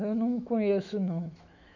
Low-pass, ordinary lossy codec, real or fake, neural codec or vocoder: 7.2 kHz; none; fake; autoencoder, 48 kHz, 128 numbers a frame, DAC-VAE, trained on Japanese speech